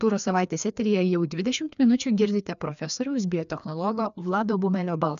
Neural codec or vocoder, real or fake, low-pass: codec, 16 kHz, 2 kbps, FreqCodec, larger model; fake; 7.2 kHz